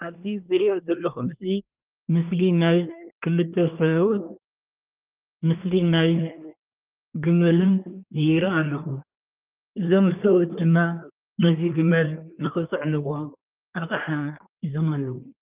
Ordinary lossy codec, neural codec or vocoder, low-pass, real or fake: Opus, 24 kbps; codec, 24 kHz, 1 kbps, SNAC; 3.6 kHz; fake